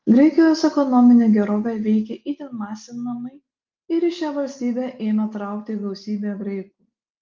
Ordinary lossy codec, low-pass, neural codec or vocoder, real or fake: Opus, 24 kbps; 7.2 kHz; none; real